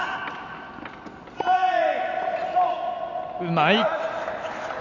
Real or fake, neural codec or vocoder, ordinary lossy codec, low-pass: real; none; none; 7.2 kHz